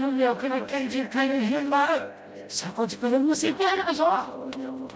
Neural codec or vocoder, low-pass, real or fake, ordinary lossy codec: codec, 16 kHz, 0.5 kbps, FreqCodec, smaller model; none; fake; none